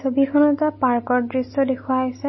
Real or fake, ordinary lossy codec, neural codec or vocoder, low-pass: real; MP3, 24 kbps; none; 7.2 kHz